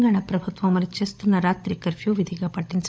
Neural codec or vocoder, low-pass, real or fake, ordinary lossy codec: codec, 16 kHz, 16 kbps, FunCodec, trained on LibriTTS, 50 frames a second; none; fake; none